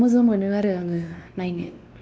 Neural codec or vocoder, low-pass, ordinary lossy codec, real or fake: codec, 16 kHz, 2 kbps, X-Codec, WavLM features, trained on Multilingual LibriSpeech; none; none; fake